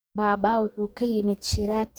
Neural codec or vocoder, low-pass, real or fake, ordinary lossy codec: codec, 44.1 kHz, 2.6 kbps, DAC; none; fake; none